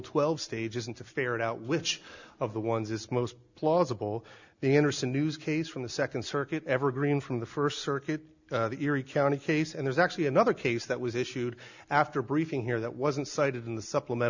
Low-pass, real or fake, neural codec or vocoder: 7.2 kHz; real; none